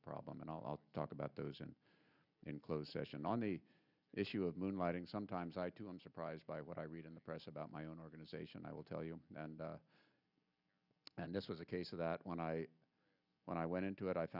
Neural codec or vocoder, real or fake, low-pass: none; real; 5.4 kHz